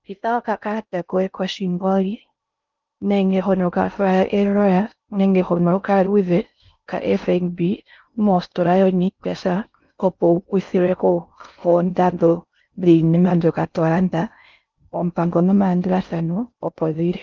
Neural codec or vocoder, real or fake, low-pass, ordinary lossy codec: codec, 16 kHz in and 24 kHz out, 0.6 kbps, FocalCodec, streaming, 4096 codes; fake; 7.2 kHz; Opus, 24 kbps